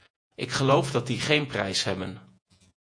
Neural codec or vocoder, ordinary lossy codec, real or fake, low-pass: vocoder, 48 kHz, 128 mel bands, Vocos; AAC, 64 kbps; fake; 9.9 kHz